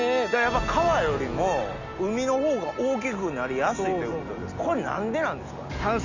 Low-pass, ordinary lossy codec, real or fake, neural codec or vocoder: 7.2 kHz; none; real; none